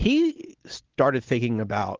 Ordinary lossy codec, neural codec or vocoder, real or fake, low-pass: Opus, 16 kbps; none; real; 7.2 kHz